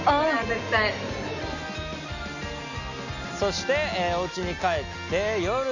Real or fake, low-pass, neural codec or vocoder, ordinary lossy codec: real; 7.2 kHz; none; none